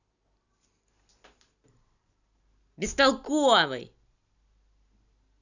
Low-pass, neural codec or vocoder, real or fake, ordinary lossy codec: 7.2 kHz; none; real; none